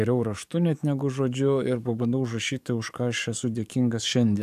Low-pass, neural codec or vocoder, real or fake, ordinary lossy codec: 14.4 kHz; codec, 44.1 kHz, 7.8 kbps, DAC; fake; AAC, 96 kbps